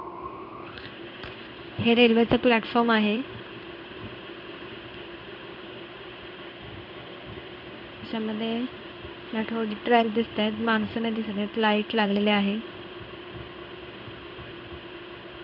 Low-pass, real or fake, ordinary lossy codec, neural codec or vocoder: 5.4 kHz; fake; none; codec, 16 kHz in and 24 kHz out, 1 kbps, XY-Tokenizer